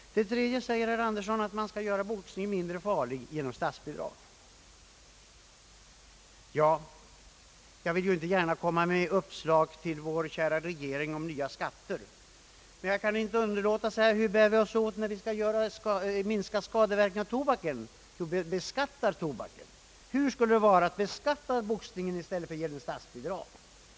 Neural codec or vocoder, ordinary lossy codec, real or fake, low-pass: none; none; real; none